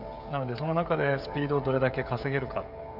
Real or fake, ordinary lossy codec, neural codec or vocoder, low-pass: fake; none; codec, 16 kHz, 16 kbps, FreqCodec, smaller model; 5.4 kHz